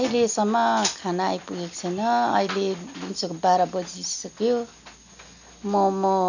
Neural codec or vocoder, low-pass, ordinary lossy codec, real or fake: none; 7.2 kHz; none; real